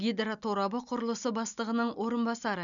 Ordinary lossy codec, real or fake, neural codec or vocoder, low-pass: none; real; none; 7.2 kHz